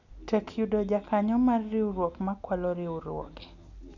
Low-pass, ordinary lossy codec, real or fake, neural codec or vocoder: 7.2 kHz; none; real; none